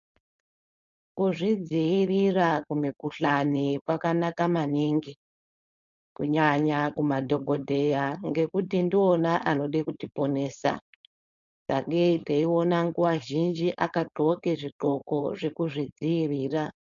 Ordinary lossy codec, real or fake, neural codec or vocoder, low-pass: MP3, 64 kbps; fake; codec, 16 kHz, 4.8 kbps, FACodec; 7.2 kHz